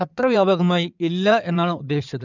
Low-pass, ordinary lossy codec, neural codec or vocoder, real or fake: 7.2 kHz; none; codec, 16 kHz in and 24 kHz out, 2.2 kbps, FireRedTTS-2 codec; fake